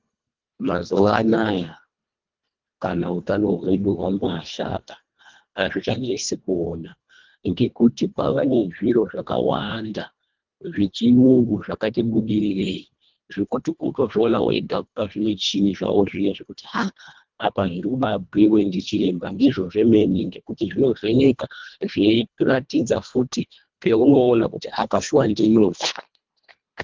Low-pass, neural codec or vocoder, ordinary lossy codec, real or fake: 7.2 kHz; codec, 24 kHz, 1.5 kbps, HILCodec; Opus, 32 kbps; fake